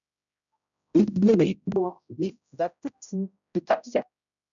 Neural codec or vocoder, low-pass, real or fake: codec, 16 kHz, 0.5 kbps, X-Codec, HuBERT features, trained on general audio; 7.2 kHz; fake